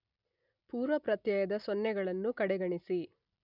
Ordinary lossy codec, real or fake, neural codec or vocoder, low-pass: none; real; none; 5.4 kHz